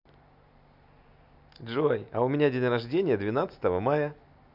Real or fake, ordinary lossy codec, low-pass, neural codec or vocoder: real; none; 5.4 kHz; none